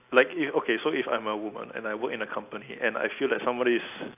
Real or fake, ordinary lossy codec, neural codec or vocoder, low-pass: real; AAC, 32 kbps; none; 3.6 kHz